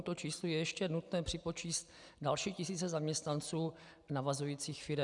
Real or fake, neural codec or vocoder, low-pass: real; none; 10.8 kHz